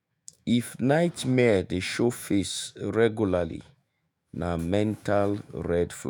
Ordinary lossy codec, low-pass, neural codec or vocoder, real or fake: none; none; autoencoder, 48 kHz, 128 numbers a frame, DAC-VAE, trained on Japanese speech; fake